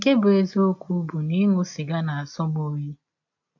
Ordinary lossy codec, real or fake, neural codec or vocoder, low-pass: AAC, 48 kbps; real; none; 7.2 kHz